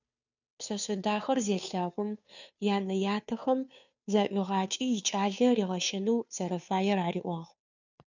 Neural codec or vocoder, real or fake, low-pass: codec, 16 kHz, 2 kbps, FunCodec, trained on Chinese and English, 25 frames a second; fake; 7.2 kHz